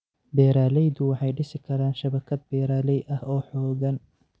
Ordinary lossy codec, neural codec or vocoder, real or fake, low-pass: none; none; real; none